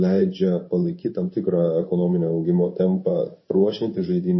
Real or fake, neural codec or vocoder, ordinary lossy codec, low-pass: fake; codec, 16 kHz in and 24 kHz out, 1 kbps, XY-Tokenizer; MP3, 24 kbps; 7.2 kHz